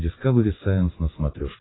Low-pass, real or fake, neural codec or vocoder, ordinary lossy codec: 7.2 kHz; real; none; AAC, 16 kbps